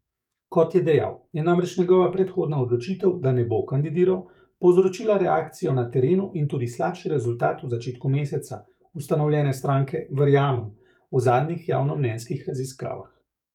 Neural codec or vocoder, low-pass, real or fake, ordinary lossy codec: codec, 44.1 kHz, 7.8 kbps, DAC; 19.8 kHz; fake; none